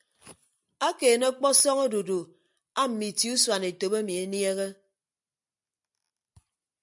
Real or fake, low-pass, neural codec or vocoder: real; 10.8 kHz; none